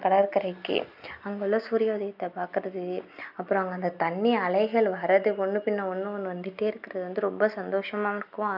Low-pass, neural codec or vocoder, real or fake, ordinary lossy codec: 5.4 kHz; none; real; none